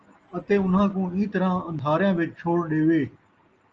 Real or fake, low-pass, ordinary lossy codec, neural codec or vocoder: real; 7.2 kHz; Opus, 24 kbps; none